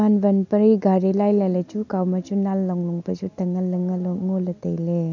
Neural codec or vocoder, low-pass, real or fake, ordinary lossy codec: none; 7.2 kHz; real; none